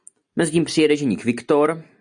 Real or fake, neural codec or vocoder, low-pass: real; none; 9.9 kHz